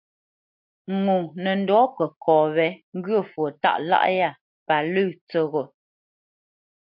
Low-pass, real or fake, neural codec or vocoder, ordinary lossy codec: 5.4 kHz; real; none; MP3, 48 kbps